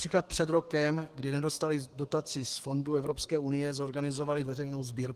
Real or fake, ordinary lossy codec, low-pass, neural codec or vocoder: fake; Opus, 24 kbps; 14.4 kHz; codec, 32 kHz, 1.9 kbps, SNAC